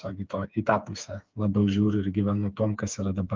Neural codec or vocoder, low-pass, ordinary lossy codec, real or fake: codec, 16 kHz, 4 kbps, FreqCodec, smaller model; 7.2 kHz; Opus, 24 kbps; fake